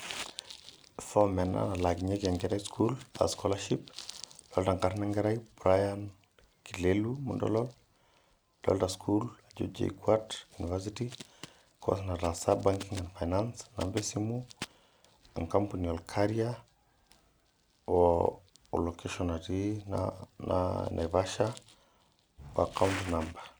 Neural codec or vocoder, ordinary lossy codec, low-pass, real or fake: none; none; none; real